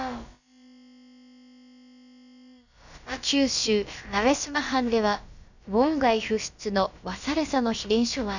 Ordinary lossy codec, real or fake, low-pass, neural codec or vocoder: none; fake; 7.2 kHz; codec, 16 kHz, about 1 kbps, DyCAST, with the encoder's durations